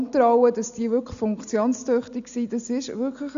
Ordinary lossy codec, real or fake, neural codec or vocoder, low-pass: none; real; none; 7.2 kHz